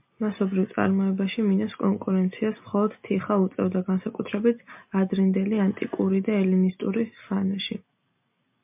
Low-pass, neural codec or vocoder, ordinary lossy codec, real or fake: 3.6 kHz; none; MP3, 32 kbps; real